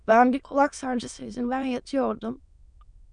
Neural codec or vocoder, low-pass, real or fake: autoencoder, 22.05 kHz, a latent of 192 numbers a frame, VITS, trained on many speakers; 9.9 kHz; fake